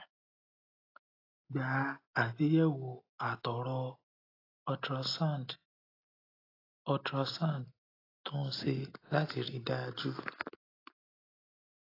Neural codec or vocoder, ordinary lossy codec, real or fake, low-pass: none; AAC, 24 kbps; real; 5.4 kHz